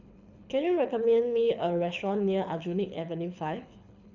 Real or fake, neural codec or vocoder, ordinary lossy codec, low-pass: fake; codec, 24 kHz, 6 kbps, HILCodec; none; 7.2 kHz